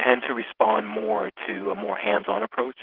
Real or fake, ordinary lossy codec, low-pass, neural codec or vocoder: fake; Opus, 16 kbps; 5.4 kHz; vocoder, 22.05 kHz, 80 mel bands, WaveNeXt